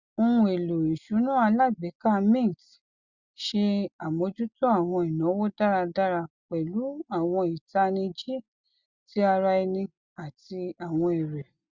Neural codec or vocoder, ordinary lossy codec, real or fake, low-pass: none; Opus, 64 kbps; real; 7.2 kHz